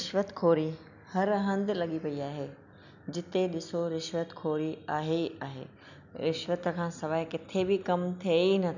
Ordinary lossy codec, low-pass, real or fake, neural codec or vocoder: none; 7.2 kHz; real; none